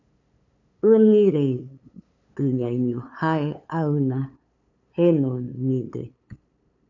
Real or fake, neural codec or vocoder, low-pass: fake; codec, 16 kHz, 8 kbps, FunCodec, trained on LibriTTS, 25 frames a second; 7.2 kHz